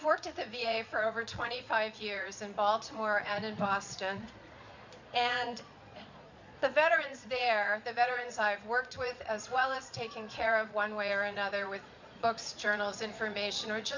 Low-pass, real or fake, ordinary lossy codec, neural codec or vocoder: 7.2 kHz; fake; MP3, 64 kbps; vocoder, 22.05 kHz, 80 mel bands, Vocos